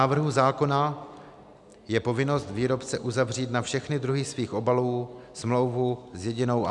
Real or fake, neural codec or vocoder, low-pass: real; none; 10.8 kHz